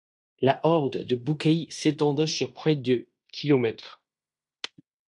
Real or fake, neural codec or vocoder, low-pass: fake; codec, 16 kHz in and 24 kHz out, 0.9 kbps, LongCat-Audio-Codec, fine tuned four codebook decoder; 10.8 kHz